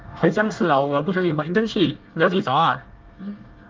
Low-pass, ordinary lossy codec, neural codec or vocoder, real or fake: 7.2 kHz; Opus, 32 kbps; codec, 24 kHz, 1 kbps, SNAC; fake